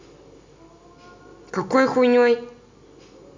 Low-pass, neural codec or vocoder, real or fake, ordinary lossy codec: 7.2 kHz; none; real; MP3, 64 kbps